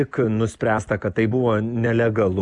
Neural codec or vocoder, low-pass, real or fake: none; 10.8 kHz; real